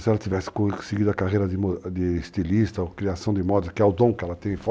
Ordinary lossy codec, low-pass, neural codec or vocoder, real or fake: none; none; none; real